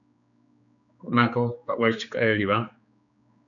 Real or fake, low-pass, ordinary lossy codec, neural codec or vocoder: fake; 7.2 kHz; MP3, 96 kbps; codec, 16 kHz, 4 kbps, X-Codec, HuBERT features, trained on general audio